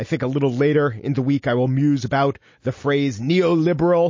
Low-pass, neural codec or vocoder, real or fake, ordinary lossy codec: 7.2 kHz; none; real; MP3, 32 kbps